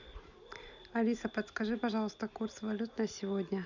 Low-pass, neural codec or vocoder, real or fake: 7.2 kHz; none; real